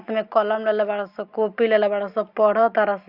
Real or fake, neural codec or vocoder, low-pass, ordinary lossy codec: real; none; 5.4 kHz; Opus, 64 kbps